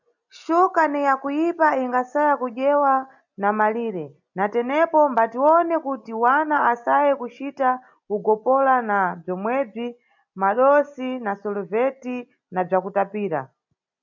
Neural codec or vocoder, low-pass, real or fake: none; 7.2 kHz; real